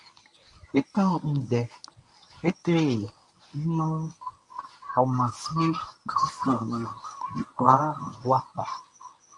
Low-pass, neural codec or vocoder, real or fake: 10.8 kHz; codec, 24 kHz, 0.9 kbps, WavTokenizer, medium speech release version 1; fake